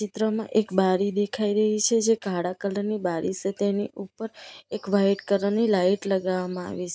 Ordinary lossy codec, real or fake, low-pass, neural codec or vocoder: none; real; none; none